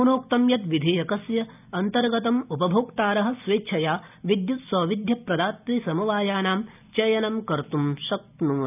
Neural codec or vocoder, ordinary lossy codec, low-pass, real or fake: none; none; 3.6 kHz; real